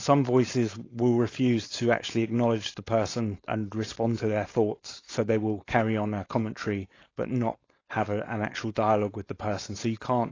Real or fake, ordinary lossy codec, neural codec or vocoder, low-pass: fake; AAC, 32 kbps; codec, 16 kHz, 4.8 kbps, FACodec; 7.2 kHz